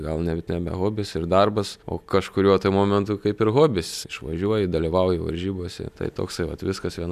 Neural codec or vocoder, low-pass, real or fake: none; 14.4 kHz; real